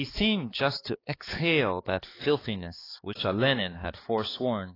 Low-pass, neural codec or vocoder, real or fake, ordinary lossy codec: 5.4 kHz; codec, 16 kHz, 4 kbps, X-Codec, HuBERT features, trained on balanced general audio; fake; AAC, 24 kbps